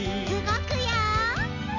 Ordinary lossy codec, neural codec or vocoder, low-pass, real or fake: none; none; 7.2 kHz; real